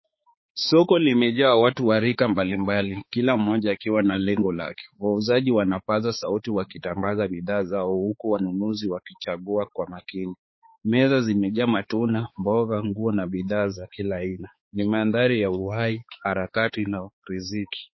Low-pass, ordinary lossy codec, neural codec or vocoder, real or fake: 7.2 kHz; MP3, 24 kbps; codec, 16 kHz, 4 kbps, X-Codec, HuBERT features, trained on balanced general audio; fake